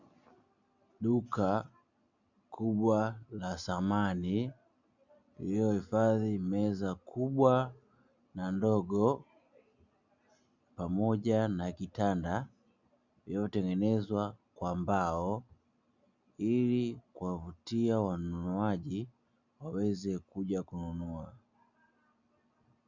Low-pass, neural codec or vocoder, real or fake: 7.2 kHz; none; real